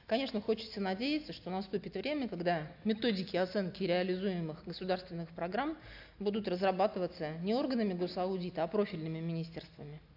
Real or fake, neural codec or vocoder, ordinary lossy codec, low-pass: real; none; AAC, 48 kbps; 5.4 kHz